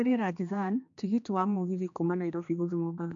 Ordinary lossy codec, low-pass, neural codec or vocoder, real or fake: MP3, 64 kbps; 7.2 kHz; codec, 16 kHz, 2 kbps, X-Codec, HuBERT features, trained on general audio; fake